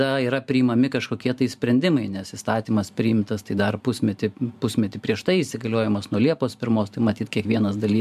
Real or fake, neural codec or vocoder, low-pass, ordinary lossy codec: fake; vocoder, 44.1 kHz, 128 mel bands every 512 samples, BigVGAN v2; 14.4 kHz; MP3, 96 kbps